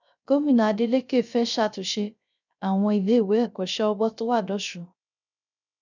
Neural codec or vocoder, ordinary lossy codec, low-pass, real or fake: codec, 16 kHz, 0.3 kbps, FocalCodec; none; 7.2 kHz; fake